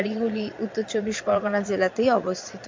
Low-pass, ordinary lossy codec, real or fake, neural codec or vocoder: 7.2 kHz; MP3, 64 kbps; fake; vocoder, 22.05 kHz, 80 mel bands, Vocos